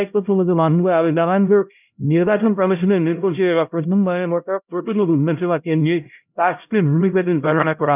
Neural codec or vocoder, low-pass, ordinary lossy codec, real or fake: codec, 16 kHz, 0.5 kbps, X-Codec, HuBERT features, trained on LibriSpeech; 3.6 kHz; none; fake